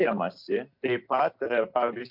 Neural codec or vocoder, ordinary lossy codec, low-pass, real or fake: none; MP3, 48 kbps; 5.4 kHz; real